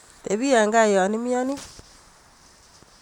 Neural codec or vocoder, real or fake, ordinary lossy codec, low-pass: vocoder, 44.1 kHz, 128 mel bands every 512 samples, BigVGAN v2; fake; none; 19.8 kHz